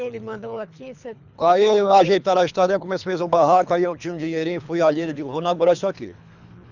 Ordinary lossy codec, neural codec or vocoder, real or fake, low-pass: none; codec, 24 kHz, 3 kbps, HILCodec; fake; 7.2 kHz